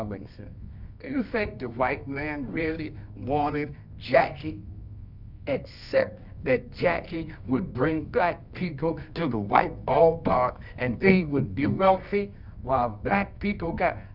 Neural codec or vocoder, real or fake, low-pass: codec, 24 kHz, 0.9 kbps, WavTokenizer, medium music audio release; fake; 5.4 kHz